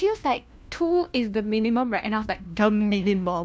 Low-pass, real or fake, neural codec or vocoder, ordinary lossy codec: none; fake; codec, 16 kHz, 1 kbps, FunCodec, trained on LibriTTS, 50 frames a second; none